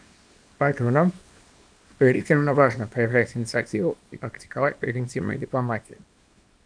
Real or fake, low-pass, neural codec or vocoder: fake; 9.9 kHz; codec, 24 kHz, 0.9 kbps, WavTokenizer, small release